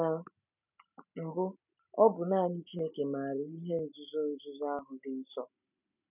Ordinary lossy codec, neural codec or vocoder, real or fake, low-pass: none; none; real; 3.6 kHz